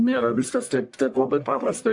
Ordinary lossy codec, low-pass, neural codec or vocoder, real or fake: AAC, 64 kbps; 10.8 kHz; codec, 44.1 kHz, 1.7 kbps, Pupu-Codec; fake